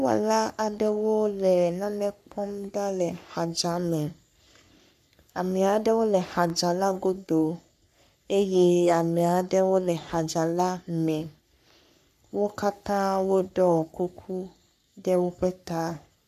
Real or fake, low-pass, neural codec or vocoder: fake; 14.4 kHz; codec, 44.1 kHz, 3.4 kbps, Pupu-Codec